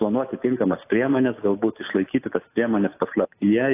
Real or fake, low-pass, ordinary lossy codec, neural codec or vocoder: real; 3.6 kHz; AAC, 24 kbps; none